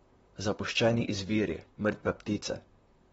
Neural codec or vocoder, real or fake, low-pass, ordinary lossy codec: vocoder, 44.1 kHz, 128 mel bands, Pupu-Vocoder; fake; 19.8 kHz; AAC, 24 kbps